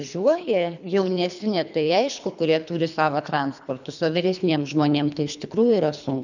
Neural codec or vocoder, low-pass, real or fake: codec, 24 kHz, 3 kbps, HILCodec; 7.2 kHz; fake